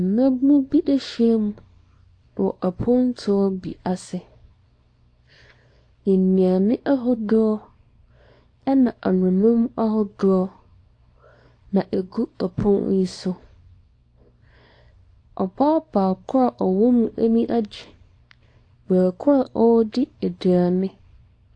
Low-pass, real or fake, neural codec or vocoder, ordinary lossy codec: 9.9 kHz; fake; codec, 24 kHz, 0.9 kbps, WavTokenizer, small release; AAC, 48 kbps